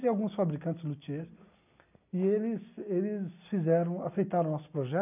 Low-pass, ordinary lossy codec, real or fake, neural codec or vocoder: 3.6 kHz; none; real; none